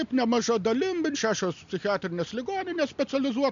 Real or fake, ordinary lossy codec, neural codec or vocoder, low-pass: real; MP3, 64 kbps; none; 7.2 kHz